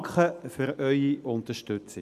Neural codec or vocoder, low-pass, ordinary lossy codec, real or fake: none; 14.4 kHz; none; real